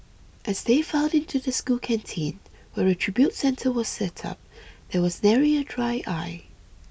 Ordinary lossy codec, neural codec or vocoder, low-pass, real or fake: none; none; none; real